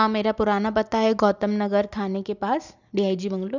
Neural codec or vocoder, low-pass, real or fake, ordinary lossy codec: none; 7.2 kHz; real; none